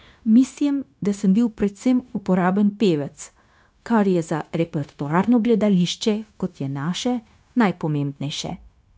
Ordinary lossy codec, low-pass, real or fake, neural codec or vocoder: none; none; fake; codec, 16 kHz, 0.9 kbps, LongCat-Audio-Codec